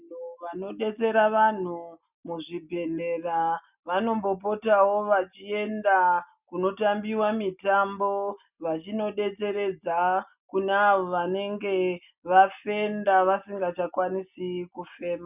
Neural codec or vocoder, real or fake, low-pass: none; real; 3.6 kHz